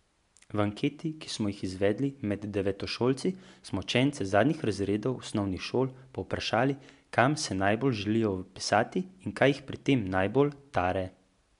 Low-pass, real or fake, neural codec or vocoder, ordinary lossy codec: 10.8 kHz; real; none; MP3, 64 kbps